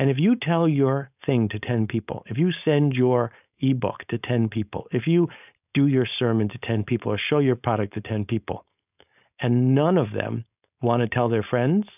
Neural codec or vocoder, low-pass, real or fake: codec, 16 kHz, 4.8 kbps, FACodec; 3.6 kHz; fake